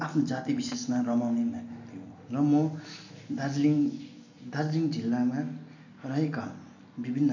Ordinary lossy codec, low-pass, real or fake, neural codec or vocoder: none; 7.2 kHz; real; none